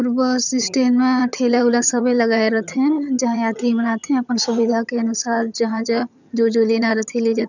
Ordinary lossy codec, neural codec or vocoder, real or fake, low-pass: none; vocoder, 22.05 kHz, 80 mel bands, HiFi-GAN; fake; 7.2 kHz